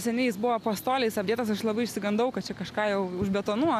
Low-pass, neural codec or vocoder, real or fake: 14.4 kHz; none; real